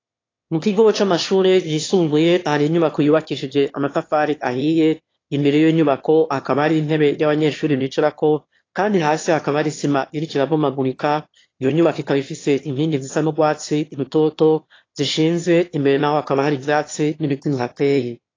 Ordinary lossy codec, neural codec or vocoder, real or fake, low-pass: AAC, 32 kbps; autoencoder, 22.05 kHz, a latent of 192 numbers a frame, VITS, trained on one speaker; fake; 7.2 kHz